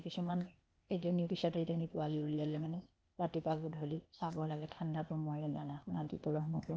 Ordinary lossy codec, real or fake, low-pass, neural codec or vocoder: none; fake; none; codec, 16 kHz, 0.8 kbps, ZipCodec